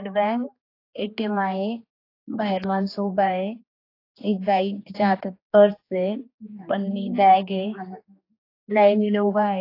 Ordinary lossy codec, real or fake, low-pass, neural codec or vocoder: AAC, 32 kbps; fake; 5.4 kHz; codec, 16 kHz, 2 kbps, X-Codec, HuBERT features, trained on general audio